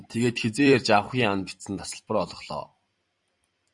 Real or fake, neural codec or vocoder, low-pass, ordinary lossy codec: fake; vocoder, 44.1 kHz, 128 mel bands every 256 samples, BigVGAN v2; 10.8 kHz; Opus, 64 kbps